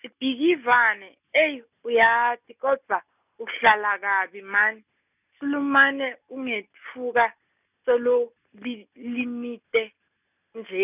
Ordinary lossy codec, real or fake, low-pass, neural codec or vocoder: none; real; 3.6 kHz; none